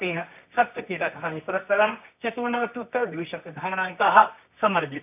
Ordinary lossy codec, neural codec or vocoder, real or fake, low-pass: none; codec, 24 kHz, 0.9 kbps, WavTokenizer, medium music audio release; fake; 3.6 kHz